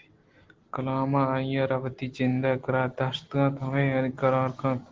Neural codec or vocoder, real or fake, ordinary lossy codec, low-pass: none; real; Opus, 16 kbps; 7.2 kHz